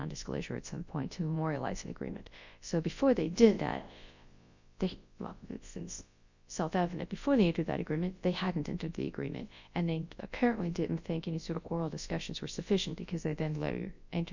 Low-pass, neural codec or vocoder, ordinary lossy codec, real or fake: 7.2 kHz; codec, 24 kHz, 0.9 kbps, WavTokenizer, large speech release; Opus, 64 kbps; fake